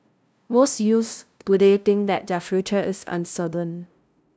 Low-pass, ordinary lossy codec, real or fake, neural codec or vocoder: none; none; fake; codec, 16 kHz, 0.5 kbps, FunCodec, trained on LibriTTS, 25 frames a second